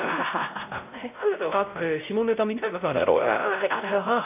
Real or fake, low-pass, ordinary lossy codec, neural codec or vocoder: fake; 3.6 kHz; none; codec, 16 kHz, 0.5 kbps, X-Codec, WavLM features, trained on Multilingual LibriSpeech